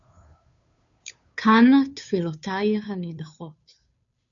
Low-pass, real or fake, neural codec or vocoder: 7.2 kHz; fake; codec, 16 kHz, 2 kbps, FunCodec, trained on Chinese and English, 25 frames a second